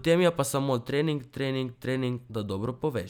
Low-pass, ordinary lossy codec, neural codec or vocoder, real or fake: 19.8 kHz; none; none; real